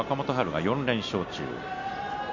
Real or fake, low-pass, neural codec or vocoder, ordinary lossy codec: real; 7.2 kHz; none; none